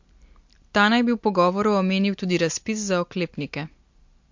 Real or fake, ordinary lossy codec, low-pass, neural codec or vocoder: real; MP3, 48 kbps; 7.2 kHz; none